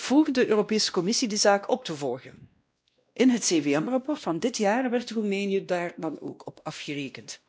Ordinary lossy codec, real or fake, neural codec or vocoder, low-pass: none; fake; codec, 16 kHz, 1 kbps, X-Codec, WavLM features, trained on Multilingual LibriSpeech; none